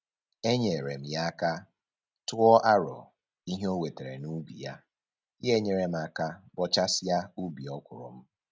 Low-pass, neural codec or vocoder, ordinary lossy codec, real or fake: none; none; none; real